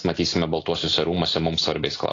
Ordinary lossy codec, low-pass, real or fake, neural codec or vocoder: AAC, 32 kbps; 7.2 kHz; real; none